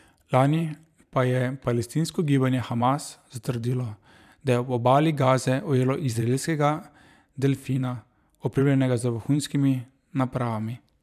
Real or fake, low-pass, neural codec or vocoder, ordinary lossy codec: fake; 14.4 kHz; vocoder, 44.1 kHz, 128 mel bands every 512 samples, BigVGAN v2; none